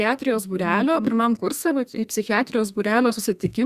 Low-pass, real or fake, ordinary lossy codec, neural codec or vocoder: 14.4 kHz; fake; Opus, 64 kbps; codec, 32 kHz, 1.9 kbps, SNAC